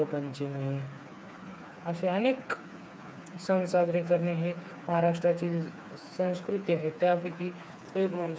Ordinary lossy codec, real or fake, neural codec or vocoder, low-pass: none; fake; codec, 16 kHz, 4 kbps, FreqCodec, smaller model; none